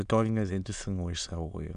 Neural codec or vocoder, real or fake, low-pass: autoencoder, 22.05 kHz, a latent of 192 numbers a frame, VITS, trained on many speakers; fake; 9.9 kHz